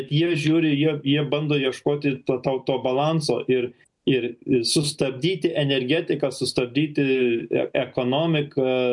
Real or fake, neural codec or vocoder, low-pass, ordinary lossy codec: real; none; 10.8 kHz; MP3, 64 kbps